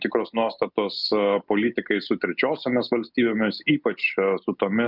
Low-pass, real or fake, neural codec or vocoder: 5.4 kHz; real; none